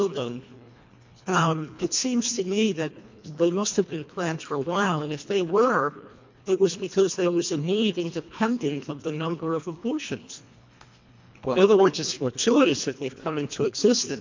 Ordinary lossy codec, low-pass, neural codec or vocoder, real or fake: MP3, 48 kbps; 7.2 kHz; codec, 24 kHz, 1.5 kbps, HILCodec; fake